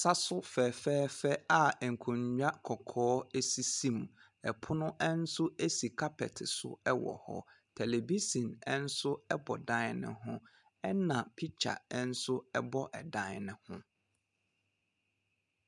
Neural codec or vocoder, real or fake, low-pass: none; real; 10.8 kHz